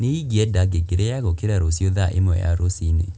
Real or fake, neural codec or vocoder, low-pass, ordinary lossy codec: real; none; none; none